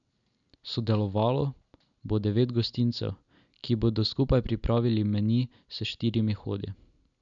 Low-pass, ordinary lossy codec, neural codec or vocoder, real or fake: 7.2 kHz; none; none; real